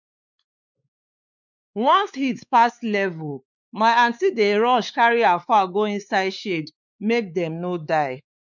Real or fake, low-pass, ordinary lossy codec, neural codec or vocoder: fake; 7.2 kHz; none; codec, 16 kHz, 4 kbps, X-Codec, WavLM features, trained on Multilingual LibriSpeech